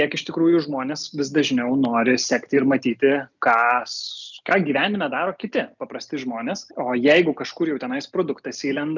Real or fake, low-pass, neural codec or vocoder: real; 7.2 kHz; none